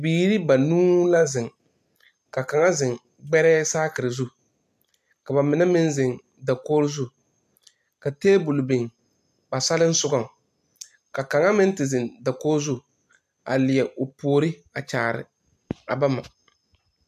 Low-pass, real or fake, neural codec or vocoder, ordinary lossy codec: 14.4 kHz; real; none; AAC, 96 kbps